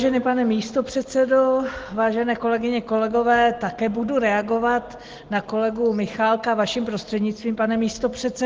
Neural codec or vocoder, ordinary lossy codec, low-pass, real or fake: none; Opus, 16 kbps; 7.2 kHz; real